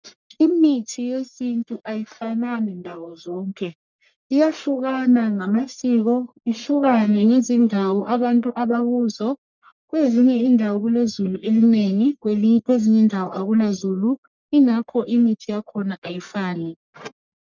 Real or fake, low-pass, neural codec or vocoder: fake; 7.2 kHz; codec, 44.1 kHz, 1.7 kbps, Pupu-Codec